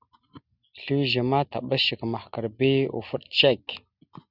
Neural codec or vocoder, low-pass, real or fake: none; 5.4 kHz; real